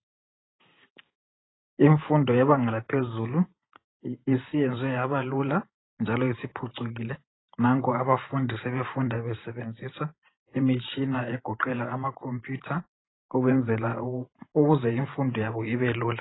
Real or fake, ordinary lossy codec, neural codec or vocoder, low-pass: fake; AAC, 16 kbps; vocoder, 44.1 kHz, 128 mel bands every 512 samples, BigVGAN v2; 7.2 kHz